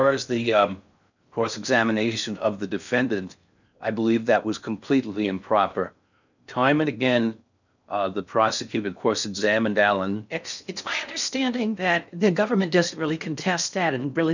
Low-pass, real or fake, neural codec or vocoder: 7.2 kHz; fake; codec, 16 kHz in and 24 kHz out, 0.6 kbps, FocalCodec, streaming, 4096 codes